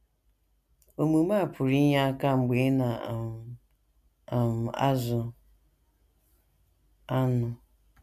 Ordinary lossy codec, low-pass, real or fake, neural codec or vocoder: none; 14.4 kHz; real; none